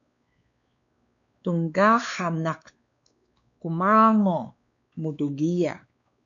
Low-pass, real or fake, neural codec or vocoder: 7.2 kHz; fake; codec, 16 kHz, 2 kbps, X-Codec, WavLM features, trained on Multilingual LibriSpeech